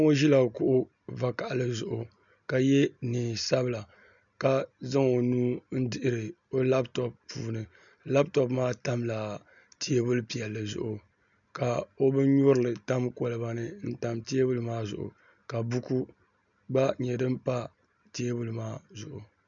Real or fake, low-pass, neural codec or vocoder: real; 7.2 kHz; none